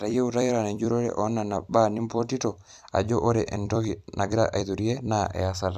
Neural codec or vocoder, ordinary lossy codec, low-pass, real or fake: vocoder, 44.1 kHz, 128 mel bands every 256 samples, BigVGAN v2; none; 14.4 kHz; fake